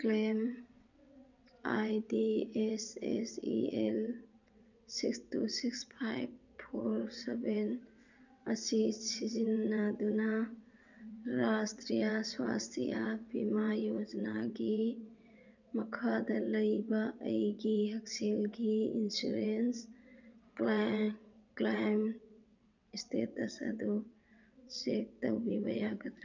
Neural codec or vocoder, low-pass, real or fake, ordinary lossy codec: vocoder, 22.05 kHz, 80 mel bands, WaveNeXt; 7.2 kHz; fake; none